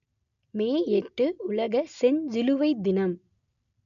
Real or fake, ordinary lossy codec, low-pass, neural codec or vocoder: real; none; 7.2 kHz; none